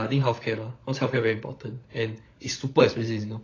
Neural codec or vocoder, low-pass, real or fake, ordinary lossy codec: codec, 16 kHz, 16 kbps, FunCodec, trained on LibriTTS, 50 frames a second; 7.2 kHz; fake; AAC, 32 kbps